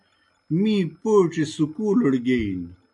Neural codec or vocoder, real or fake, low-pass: none; real; 10.8 kHz